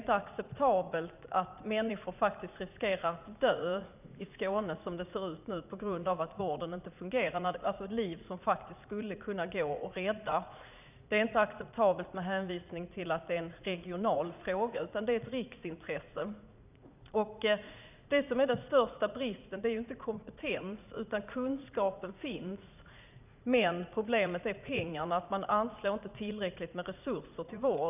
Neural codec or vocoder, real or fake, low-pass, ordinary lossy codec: vocoder, 44.1 kHz, 80 mel bands, Vocos; fake; 3.6 kHz; none